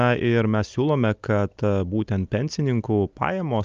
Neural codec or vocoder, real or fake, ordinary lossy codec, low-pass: none; real; Opus, 24 kbps; 7.2 kHz